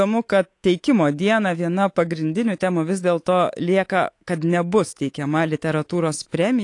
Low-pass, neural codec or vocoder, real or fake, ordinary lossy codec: 10.8 kHz; codec, 24 kHz, 3.1 kbps, DualCodec; fake; AAC, 48 kbps